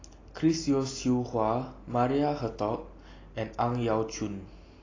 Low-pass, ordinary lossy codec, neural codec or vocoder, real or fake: 7.2 kHz; AAC, 32 kbps; none; real